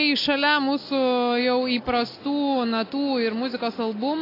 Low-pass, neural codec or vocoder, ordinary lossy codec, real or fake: 5.4 kHz; none; AAC, 24 kbps; real